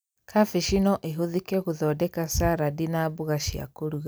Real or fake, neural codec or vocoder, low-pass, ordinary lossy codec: real; none; none; none